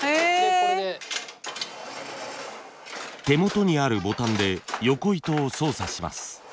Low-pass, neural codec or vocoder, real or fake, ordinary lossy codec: none; none; real; none